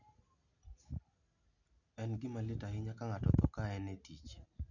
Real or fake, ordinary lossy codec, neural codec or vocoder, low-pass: real; none; none; 7.2 kHz